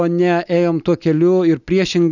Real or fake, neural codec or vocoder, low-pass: real; none; 7.2 kHz